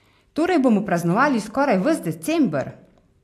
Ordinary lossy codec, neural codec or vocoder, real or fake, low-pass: AAC, 64 kbps; none; real; 14.4 kHz